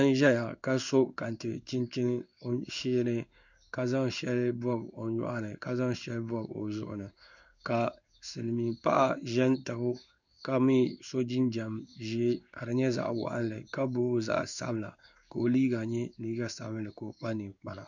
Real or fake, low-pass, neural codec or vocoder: fake; 7.2 kHz; codec, 16 kHz in and 24 kHz out, 1 kbps, XY-Tokenizer